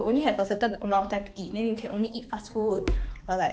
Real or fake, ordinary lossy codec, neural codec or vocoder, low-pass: fake; none; codec, 16 kHz, 2 kbps, X-Codec, HuBERT features, trained on balanced general audio; none